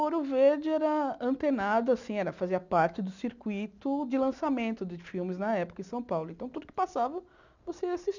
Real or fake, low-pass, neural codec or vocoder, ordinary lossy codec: real; 7.2 kHz; none; none